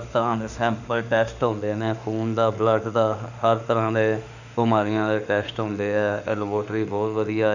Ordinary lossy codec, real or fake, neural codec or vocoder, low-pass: none; fake; autoencoder, 48 kHz, 32 numbers a frame, DAC-VAE, trained on Japanese speech; 7.2 kHz